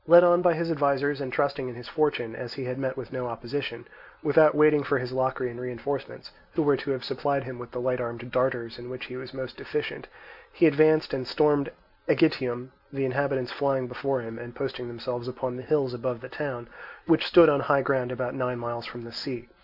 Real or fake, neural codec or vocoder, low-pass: real; none; 5.4 kHz